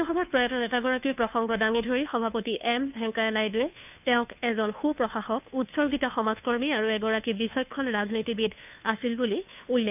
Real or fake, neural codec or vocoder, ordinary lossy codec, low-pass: fake; codec, 16 kHz, 2 kbps, FunCodec, trained on Chinese and English, 25 frames a second; none; 3.6 kHz